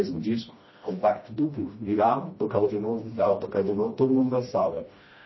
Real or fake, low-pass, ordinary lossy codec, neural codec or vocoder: fake; 7.2 kHz; MP3, 24 kbps; codec, 16 kHz, 1 kbps, FreqCodec, smaller model